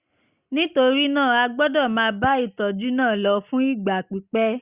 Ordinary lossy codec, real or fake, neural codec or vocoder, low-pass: Opus, 64 kbps; real; none; 3.6 kHz